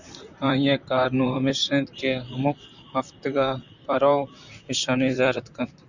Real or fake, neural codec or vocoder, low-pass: fake; vocoder, 44.1 kHz, 128 mel bands, Pupu-Vocoder; 7.2 kHz